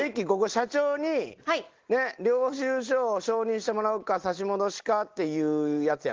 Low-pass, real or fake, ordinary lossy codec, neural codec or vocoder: 7.2 kHz; real; Opus, 16 kbps; none